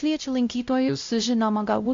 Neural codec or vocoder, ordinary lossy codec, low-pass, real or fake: codec, 16 kHz, 0.5 kbps, X-Codec, WavLM features, trained on Multilingual LibriSpeech; AAC, 48 kbps; 7.2 kHz; fake